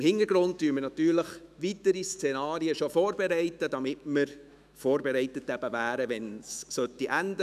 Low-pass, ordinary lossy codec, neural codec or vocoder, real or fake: 14.4 kHz; none; autoencoder, 48 kHz, 128 numbers a frame, DAC-VAE, trained on Japanese speech; fake